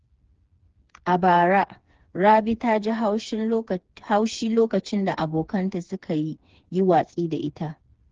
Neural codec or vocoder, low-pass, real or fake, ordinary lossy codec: codec, 16 kHz, 4 kbps, FreqCodec, smaller model; 7.2 kHz; fake; Opus, 16 kbps